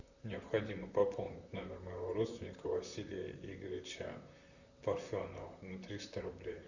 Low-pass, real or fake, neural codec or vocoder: 7.2 kHz; fake; vocoder, 44.1 kHz, 128 mel bands, Pupu-Vocoder